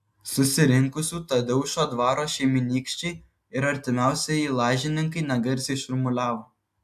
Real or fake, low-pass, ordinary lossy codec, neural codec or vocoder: real; 14.4 kHz; AAC, 96 kbps; none